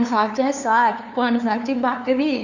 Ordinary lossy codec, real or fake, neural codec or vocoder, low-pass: none; fake; codec, 16 kHz, 2 kbps, FunCodec, trained on LibriTTS, 25 frames a second; 7.2 kHz